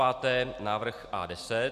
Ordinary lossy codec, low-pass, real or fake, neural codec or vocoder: Opus, 64 kbps; 14.4 kHz; real; none